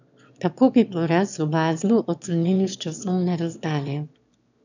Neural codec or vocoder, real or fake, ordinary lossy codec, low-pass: autoencoder, 22.05 kHz, a latent of 192 numbers a frame, VITS, trained on one speaker; fake; none; 7.2 kHz